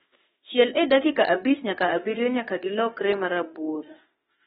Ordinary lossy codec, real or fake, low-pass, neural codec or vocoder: AAC, 16 kbps; fake; 19.8 kHz; autoencoder, 48 kHz, 32 numbers a frame, DAC-VAE, trained on Japanese speech